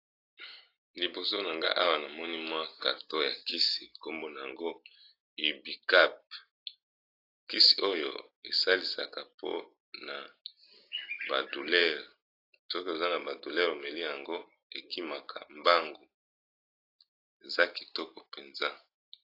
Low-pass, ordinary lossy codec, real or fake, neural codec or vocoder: 5.4 kHz; AAC, 32 kbps; real; none